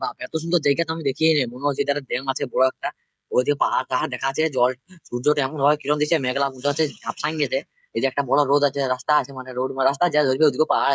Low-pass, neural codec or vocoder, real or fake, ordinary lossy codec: none; codec, 16 kHz, 16 kbps, FreqCodec, smaller model; fake; none